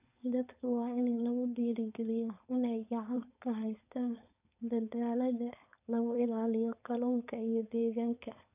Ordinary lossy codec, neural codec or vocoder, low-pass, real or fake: none; codec, 16 kHz, 4.8 kbps, FACodec; 3.6 kHz; fake